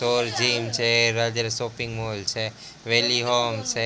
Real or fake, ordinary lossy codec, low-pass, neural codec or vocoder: real; none; none; none